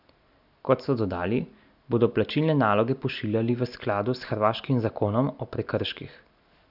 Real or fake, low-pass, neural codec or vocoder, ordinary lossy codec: real; 5.4 kHz; none; none